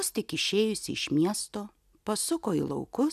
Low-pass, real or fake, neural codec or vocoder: 14.4 kHz; real; none